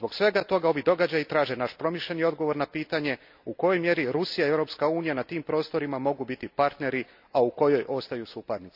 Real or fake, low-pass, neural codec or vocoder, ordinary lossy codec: real; 5.4 kHz; none; none